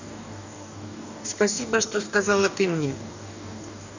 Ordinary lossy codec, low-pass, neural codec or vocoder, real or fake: none; 7.2 kHz; codec, 44.1 kHz, 2.6 kbps, DAC; fake